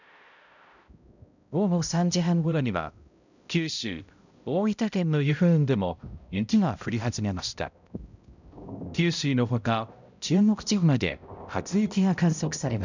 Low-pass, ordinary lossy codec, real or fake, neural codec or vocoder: 7.2 kHz; none; fake; codec, 16 kHz, 0.5 kbps, X-Codec, HuBERT features, trained on balanced general audio